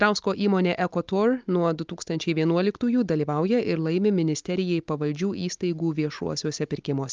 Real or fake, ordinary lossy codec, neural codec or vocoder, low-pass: real; Opus, 24 kbps; none; 7.2 kHz